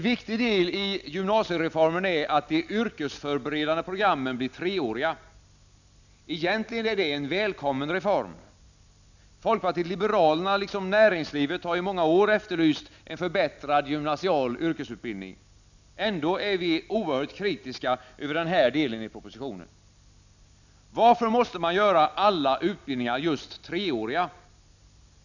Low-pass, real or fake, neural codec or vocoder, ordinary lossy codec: 7.2 kHz; real; none; none